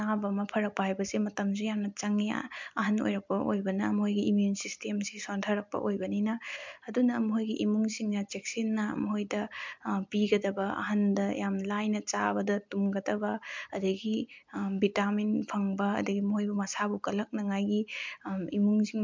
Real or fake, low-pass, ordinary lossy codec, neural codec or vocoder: real; 7.2 kHz; MP3, 64 kbps; none